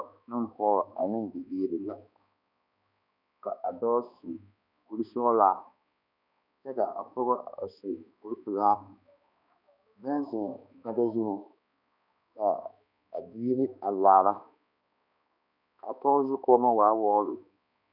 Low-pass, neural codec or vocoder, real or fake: 5.4 kHz; codec, 16 kHz, 2 kbps, X-Codec, HuBERT features, trained on balanced general audio; fake